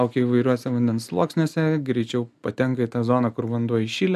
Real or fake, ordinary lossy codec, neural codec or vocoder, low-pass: real; MP3, 96 kbps; none; 14.4 kHz